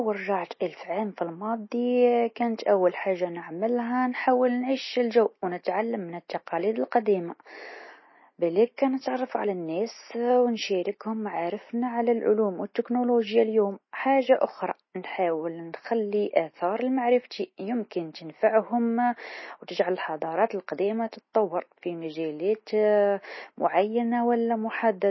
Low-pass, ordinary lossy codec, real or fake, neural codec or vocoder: 7.2 kHz; MP3, 24 kbps; real; none